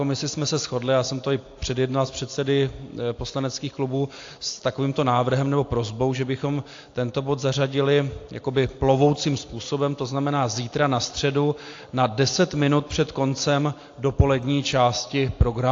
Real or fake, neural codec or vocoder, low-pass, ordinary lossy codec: real; none; 7.2 kHz; AAC, 48 kbps